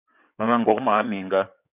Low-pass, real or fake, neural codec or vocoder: 3.6 kHz; fake; codec, 16 kHz in and 24 kHz out, 2.2 kbps, FireRedTTS-2 codec